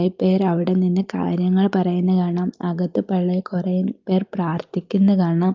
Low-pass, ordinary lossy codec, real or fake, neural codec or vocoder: 7.2 kHz; Opus, 24 kbps; real; none